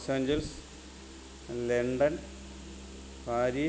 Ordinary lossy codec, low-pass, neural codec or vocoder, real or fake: none; none; none; real